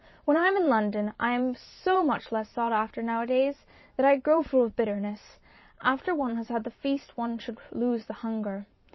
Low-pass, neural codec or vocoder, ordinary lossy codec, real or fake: 7.2 kHz; vocoder, 44.1 kHz, 128 mel bands every 512 samples, BigVGAN v2; MP3, 24 kbps; fake